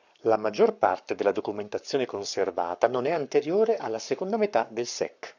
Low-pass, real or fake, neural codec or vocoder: 7.2 kHz; fake; codec, 44.1 kHz, 7.8 kbps, Pupu-Codec